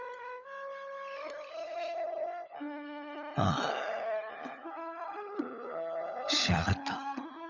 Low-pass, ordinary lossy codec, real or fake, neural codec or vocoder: 7.2 kHz; none; fake; codec, 16 kHz, 16 kbps, FunCodec, trained on LibriTTS, 50 frames a second